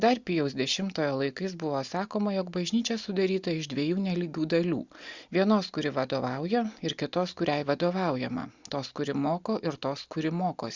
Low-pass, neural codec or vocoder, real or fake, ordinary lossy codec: 7.2 kHz; none; real; Opus, 64 kbps